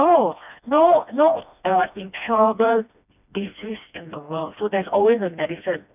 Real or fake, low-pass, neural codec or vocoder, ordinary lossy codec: fake; 3.6 kHz; codec, 16 kHz, 1 kbps, FreqCodec, smaller model; none